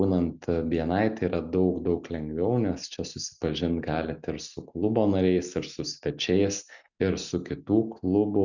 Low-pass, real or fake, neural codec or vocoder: 7.2 kHz; real; none